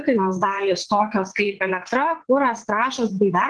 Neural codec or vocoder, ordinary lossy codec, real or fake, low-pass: vocoder, 22.05 kHz, 80 mel bands, WaveNeXt; Opus, 16 kbps; fake; 9.9 kHz